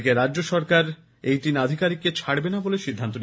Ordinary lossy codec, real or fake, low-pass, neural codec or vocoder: none; real; none; none